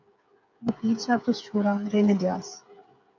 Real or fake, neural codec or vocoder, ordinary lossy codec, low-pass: fake; codec, 16 kHz, 8 kbps, FreqCodec, smaller model; AAC, 48 kbps; 7.2 kHz